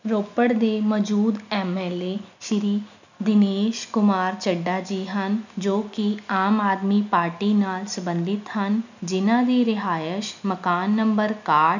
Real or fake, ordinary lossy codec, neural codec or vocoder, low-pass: real; none; none; 7.2 kHz